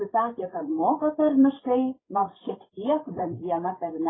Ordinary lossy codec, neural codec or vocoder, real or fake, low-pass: AAC, 16 kbps; codec, 16 kHz, 8 kbps, FreqCodec, larger model; fake; 7.2 kHz